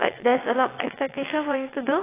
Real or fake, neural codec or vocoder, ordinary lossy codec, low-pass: fake; vocoder, 22.05 kHz, 80 mel bands, WaveNeXt; AAC, 16 kbps; 3.6 kHz